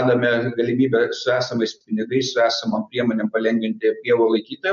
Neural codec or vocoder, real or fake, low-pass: none; real; 7.2 kHz